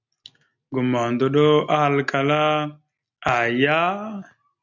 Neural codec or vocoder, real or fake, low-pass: none; real; 7.2 kHz